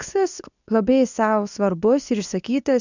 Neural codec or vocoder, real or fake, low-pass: codec, 24 kHz, 0.9 kbps, WavTokenizer, medium speech release version 2; fake; 7.2 kHz